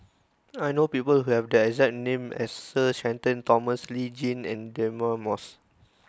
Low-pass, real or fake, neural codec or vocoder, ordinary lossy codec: none; real; none; none